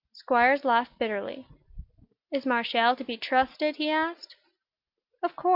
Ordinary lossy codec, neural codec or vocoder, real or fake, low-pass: Opus, 64 kbps; none; real; 5.4 kHz